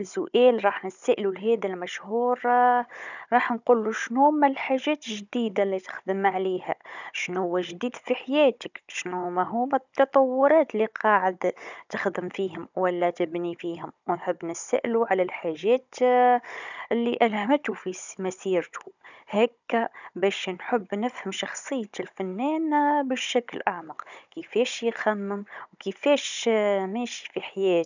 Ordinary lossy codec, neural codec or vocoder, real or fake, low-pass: none; codec, 16 kHz, 16 kbps, FunCodec, trained on Chinese and English, 50 frames a second; fake; 7.2 kHz